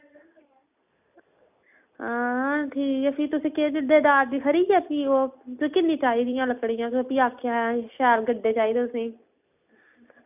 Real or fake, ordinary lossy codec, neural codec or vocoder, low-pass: real; none; none; 3.6 kHz